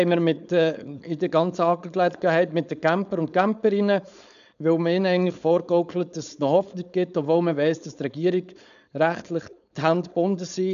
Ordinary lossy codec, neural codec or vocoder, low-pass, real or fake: none; codec, 16 kHz, 4.8 kbps, FACodec; 7.2 kHz; fake